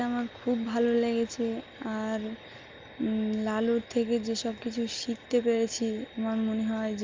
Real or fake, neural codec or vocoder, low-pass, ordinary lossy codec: real; none; 7.2 kHz; Opus, 24 kbps